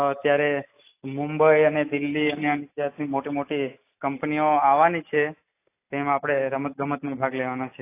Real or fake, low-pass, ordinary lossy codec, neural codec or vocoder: real; 3.6 kHz; AAC, 24 kbps; none